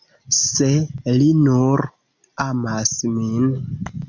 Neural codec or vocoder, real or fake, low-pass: none; real; 7.2 kHz